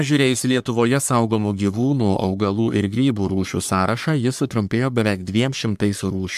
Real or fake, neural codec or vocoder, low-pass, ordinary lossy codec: fake; codec, 44.1 kHz, 3.4 kbps, Pupu-Codec; 14.4 kHz; MP3, 96 kbps